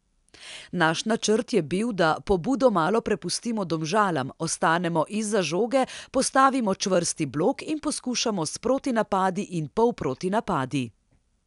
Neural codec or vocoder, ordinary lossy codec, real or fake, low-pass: none; none; real; 10.8 kHz